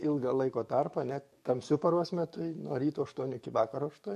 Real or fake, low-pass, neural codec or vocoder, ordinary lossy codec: fake; 14.4 kHz; codec, 44.1 kHz, 7.8 kbps, DAC; MP3, 64 kbps